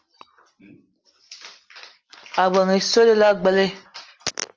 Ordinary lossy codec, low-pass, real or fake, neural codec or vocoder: Opus, 24 kbps; 7.2 kHz; real; none